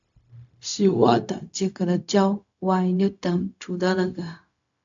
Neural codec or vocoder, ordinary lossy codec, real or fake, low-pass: codec, 16 kHz, 0.4 kbps, LongCat-Audio-Codec; AAC, 64 kbps; fake; 7.2 kHz